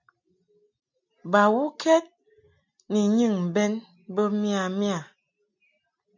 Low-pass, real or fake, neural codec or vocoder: 7.2 kHz; real; none